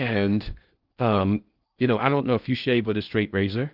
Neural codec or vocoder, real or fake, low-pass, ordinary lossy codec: codec, 16 kHz in and 24 kHz out, 0.6 kbps, FocalCodec, streaming, 2048 codes; fake; 5.4 kHz; Opus, 32 kbps